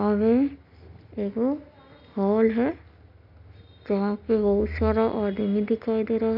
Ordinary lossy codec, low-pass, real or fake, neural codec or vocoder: none; 5.4 kHz; real; none